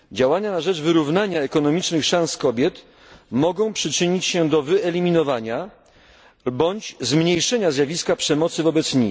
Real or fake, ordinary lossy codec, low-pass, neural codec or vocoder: real; none; none; none